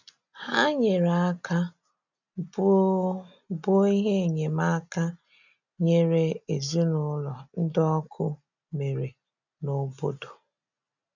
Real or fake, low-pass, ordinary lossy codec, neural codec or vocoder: real; 7.2 kHz; none; none